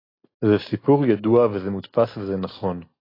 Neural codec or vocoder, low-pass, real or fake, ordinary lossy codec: none; 5.4 kHz; real; AAC, 24 kbps